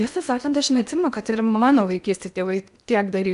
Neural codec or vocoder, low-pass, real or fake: codec, 16 kHz in and 24 kHz out, 0.8 kbps, FocalCodec, streaming, 65536 codes; 10.8 kHz; fake